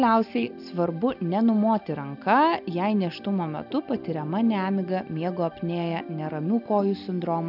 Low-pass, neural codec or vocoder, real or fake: 5.4 kHz; none; real